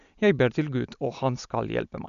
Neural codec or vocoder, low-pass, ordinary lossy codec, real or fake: none; 7.2 kHz; none; real